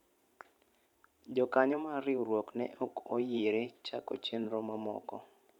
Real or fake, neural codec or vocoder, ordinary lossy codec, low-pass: fake; vocoder, 44.1 kHz, 128 mel bands every 512 samples, BigVGAN v2; none; 19.8 kHz